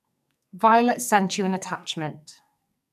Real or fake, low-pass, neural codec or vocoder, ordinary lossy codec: fake; 14.4 kHz; codec, 44.1 kHz, 2.6 kbps, SNAC; none